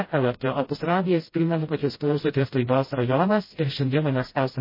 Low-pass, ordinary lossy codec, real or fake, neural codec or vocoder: 5.4 kHz; MP3, 24 kbps; fake; codec, 16 kHz, 0.5 kbps, FreqCodec, smaller model